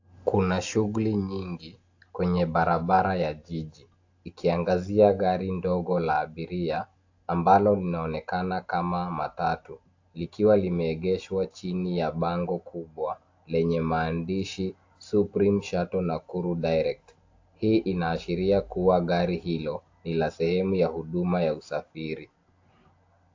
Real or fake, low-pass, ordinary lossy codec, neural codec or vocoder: real; 7.2 kHz; AAC, 48 kbps; none